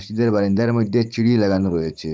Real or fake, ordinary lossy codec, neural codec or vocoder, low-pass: fake; none; codec, 16 kHz, 16 kbps, FunCodec, trained on Chinese and English, 50 frames a second; none